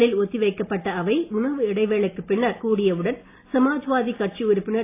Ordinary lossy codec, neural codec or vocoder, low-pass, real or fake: AAC, 24 kbps; none; 3.6 kHz; real